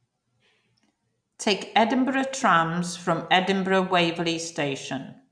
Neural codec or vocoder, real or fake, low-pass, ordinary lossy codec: none; real; 9.9 kHz; none